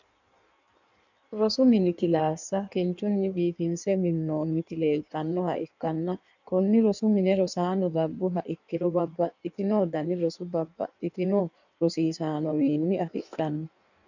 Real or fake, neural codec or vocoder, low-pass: fake; codec, 16 kHz in and 24 kHz out, 1.1 kbps, FireRedTTS-2 codec; 7.2 kHz